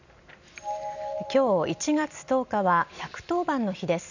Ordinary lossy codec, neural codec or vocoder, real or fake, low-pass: none; none; real; 7.2 kHz